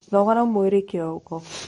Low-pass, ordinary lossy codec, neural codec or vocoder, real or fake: 10.8 kHz; MP3, 48 kbps; codec, 24 kHz, 0.9 kbps, WavTokenizer, medium speech release version 2; fake